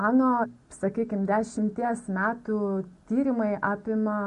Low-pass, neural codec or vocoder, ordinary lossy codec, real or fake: 14.4 kHz; none; MP3, 48 kbps; real